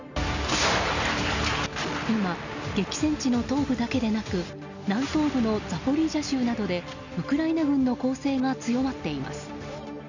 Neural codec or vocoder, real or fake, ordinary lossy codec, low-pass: none; real; none; 7.2 kHz